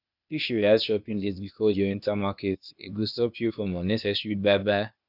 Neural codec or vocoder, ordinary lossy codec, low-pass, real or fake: codec, 16 kHz, 0.8 kbps, ZipCodec; none; 5.4 kHz; fake